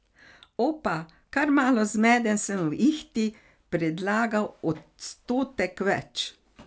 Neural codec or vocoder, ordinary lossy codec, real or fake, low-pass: none; none; real; none